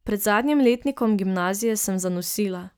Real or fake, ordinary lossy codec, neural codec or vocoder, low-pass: real; none; none; none